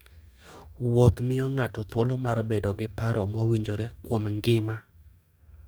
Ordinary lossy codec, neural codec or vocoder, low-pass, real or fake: none; codec, 44.1 kHz, 2.6 kbps, DAC; none; fake